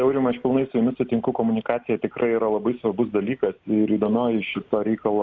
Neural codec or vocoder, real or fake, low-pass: none; real; 7.2 kHz